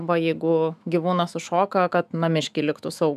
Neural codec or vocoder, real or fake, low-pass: autoencoder, 48 kHz, 128 numbers a frame, DAC-VAE, trained on Japanese speech; fake; 14.4 kHz